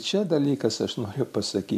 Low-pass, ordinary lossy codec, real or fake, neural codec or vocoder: 14.4 kHz; MP3, 96 kbps; real; none